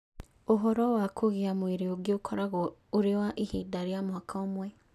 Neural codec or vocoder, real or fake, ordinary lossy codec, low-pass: none; real; none; 14.4 kHz